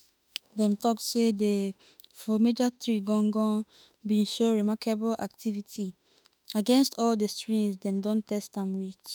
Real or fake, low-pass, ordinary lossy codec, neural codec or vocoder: fake; none; none; autoencoder, 48 kHz, 32 numbers a frame, DAC-VAE, trained on Japanese speech